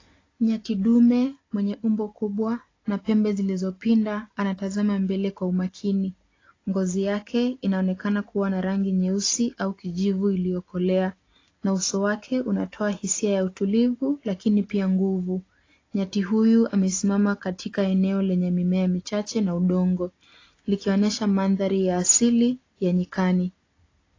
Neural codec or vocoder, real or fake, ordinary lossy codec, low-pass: none; real; AAC, 32 kbps; 7.2 kHz